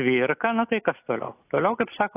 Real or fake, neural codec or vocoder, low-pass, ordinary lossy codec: real; none; 3.6 kHz; AAC, 16 kbps